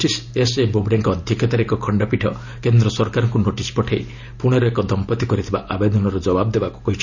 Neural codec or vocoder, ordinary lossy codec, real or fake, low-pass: none; none; real; 7.2 kHz